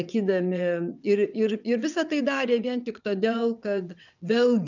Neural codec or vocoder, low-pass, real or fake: vocoder, 22.05 kHz, 80 mel bands, WaveNeXt; 7.2 kHz; fake